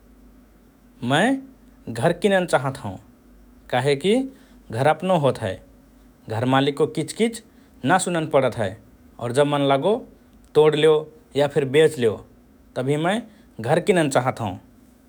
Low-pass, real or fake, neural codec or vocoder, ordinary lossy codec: none; fake; autoencoder, 48 kHz, 128 numbers a frame, DAC-VAE, trained on Japanese speech; none